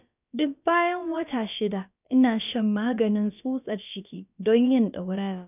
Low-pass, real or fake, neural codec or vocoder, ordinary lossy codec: 3.6 kHz; fake; codec, 16 kHz, about 1 kbps, DyCAST, with the encoder's durations; none